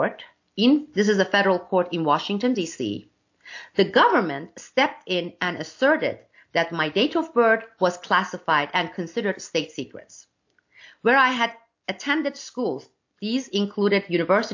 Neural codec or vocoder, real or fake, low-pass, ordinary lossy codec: none; real; 7.2 kHz; AAC, 48 kbps